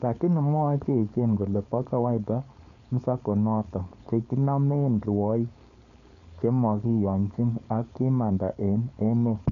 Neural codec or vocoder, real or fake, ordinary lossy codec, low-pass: codec, 16 kHz, 4.8 kbps, FACodec; fake; none; 7.2 kHz